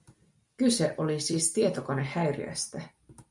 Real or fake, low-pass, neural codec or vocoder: real; 10.8 kHz; none